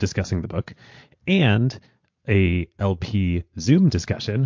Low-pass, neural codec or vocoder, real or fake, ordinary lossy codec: 7.2 kHz; vocoder, 22.05 kHz, 80 mel bands, Vocos; fake; MP3, 48 kbps